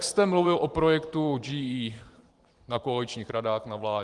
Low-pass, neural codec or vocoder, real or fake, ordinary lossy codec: 10.8 kHz; vocoder, 44.1 kHz, 128 mel bands every 512 samples, BigVGAN v2; fake; Opus, 24 kbps